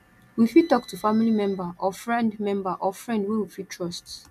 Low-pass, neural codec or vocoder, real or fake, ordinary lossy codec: 14.4 kHz; none; real; none